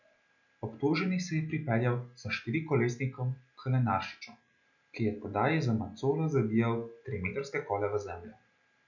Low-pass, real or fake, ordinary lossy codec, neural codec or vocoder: 7.2 kHz; real; none; none